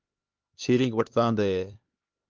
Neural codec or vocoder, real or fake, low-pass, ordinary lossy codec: codec, 16 kHz, 2 kbps, X-Codec, HuBERT features, trained on LibriSpeech; fake; 7.2 kHz; Opus, 24 kbps